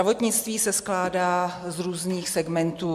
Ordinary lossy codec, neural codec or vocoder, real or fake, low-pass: MP3, 96 kbps; none; real; 14.4 kHz